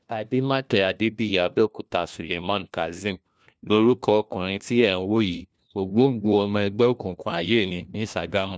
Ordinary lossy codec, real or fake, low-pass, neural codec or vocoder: none; fake; none; codec, 16 kHz, 1 kbps, FunCodec, trained on LibriTTS, 50 frames a second